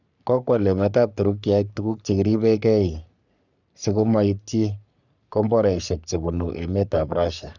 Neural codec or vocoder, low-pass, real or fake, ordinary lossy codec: codec, 44.1 kHz, 3.4 kbps, Pupu-Codec; 7.2 kHz; fake; none